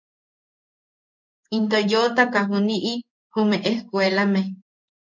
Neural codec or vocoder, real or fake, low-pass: codec, 16 kHz in and 24 kHz out, 1 kbps, XY-Tokenizer; fake; 7.2 kHz